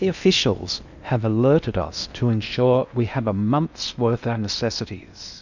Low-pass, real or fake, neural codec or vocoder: 7.2 kHz; fake; codec, 16 kHz in and 24 kHz out, 0.8 kbps, FocalCodec, streaming, 65536 codes